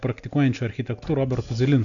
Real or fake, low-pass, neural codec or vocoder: real; 7.2 kHz; none